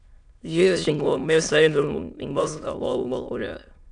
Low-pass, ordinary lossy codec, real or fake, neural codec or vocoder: 9.9 kHz; MP3, 96 kbps; fake; autoencoder, 22.05 kHz, a latent of 192 numbers a frame, VITS, trained on many speakers